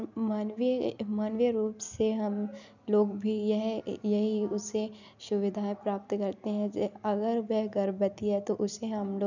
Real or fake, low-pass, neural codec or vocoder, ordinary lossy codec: real; 7.2 kHz; none; none